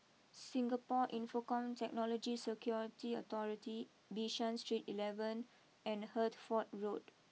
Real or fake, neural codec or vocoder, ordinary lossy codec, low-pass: real; none; none; none